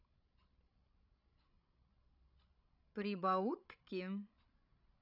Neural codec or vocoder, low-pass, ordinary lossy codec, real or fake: codec, 16 kHz, 16 kbps, FreqCodec, larger model; 5.4 kHz; none; fake